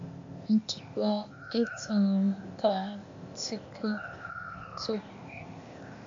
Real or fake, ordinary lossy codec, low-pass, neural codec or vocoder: fake; MP3, 48 kbps; 7.2 kHz; codec, 16 kHz, 0.8 kbps, ZipCodec